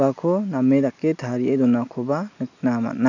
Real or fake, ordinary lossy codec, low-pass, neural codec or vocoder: real; none; 7.2 kHz; none